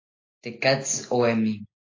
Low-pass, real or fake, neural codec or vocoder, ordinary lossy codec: 7.2 kHz; real; none; AAC, 32 kbps